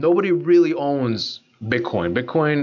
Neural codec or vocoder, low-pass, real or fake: none; 7.2 kHz; real